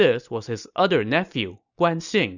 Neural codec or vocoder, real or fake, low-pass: none; real; 7.2 kHz